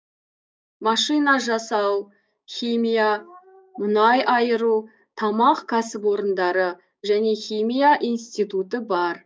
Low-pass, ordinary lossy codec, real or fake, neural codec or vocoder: none; none; fake; codec, 16 kHz, 6 kbps, DAC